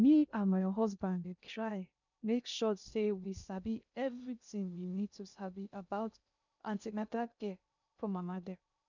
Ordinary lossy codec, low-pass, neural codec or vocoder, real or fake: none; 7.2 kHz; codec, 16 kHz in and 24 kHz out, 0.6 kbps, FocalCodec, streaming, 2048 codes; fake